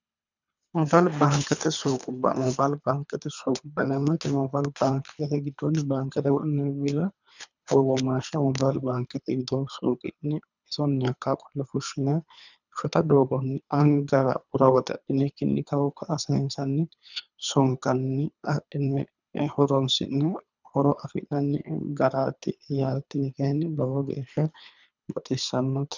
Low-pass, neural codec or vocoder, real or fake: 7.2 kHz; codec, 24 kHz, 3 kbps, HILCodec; fake